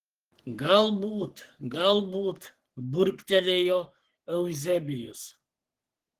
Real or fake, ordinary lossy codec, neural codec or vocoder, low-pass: fake; Opus, 16 kbps; codec, 44.1 kHz, 3.4 kbps, Pupu-Codec; 14.4 kHz